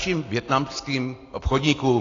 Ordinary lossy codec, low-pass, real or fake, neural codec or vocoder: AAC, 32 kbps; 7.2 kHz; real; none